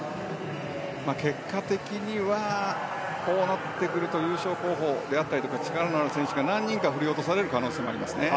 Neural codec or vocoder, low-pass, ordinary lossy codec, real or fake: none; none; none; real